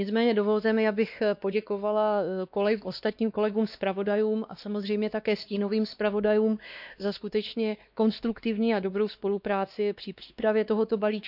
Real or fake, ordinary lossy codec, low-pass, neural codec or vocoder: fake; none; 5.4 kHz; codec, 16 kHz, 2 kbps, X-Codec, WavLM features, trained on Multilingual LibriSpeech